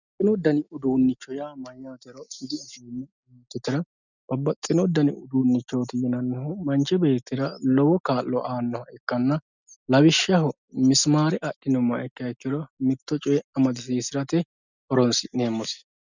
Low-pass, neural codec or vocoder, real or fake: 7.2 kHz; none; real